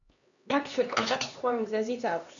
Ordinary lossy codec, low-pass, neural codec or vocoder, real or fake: MP3, 96 kbps; 7.2 kHz; codec, 16 kHz, 2 kbps, X-Codec, HuBERT features, trained on LibriSpeech; fake